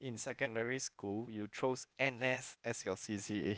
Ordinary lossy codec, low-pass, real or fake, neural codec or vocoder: none; none; fake; codec, 16 kHz, 0.8 kbps, ZipCodec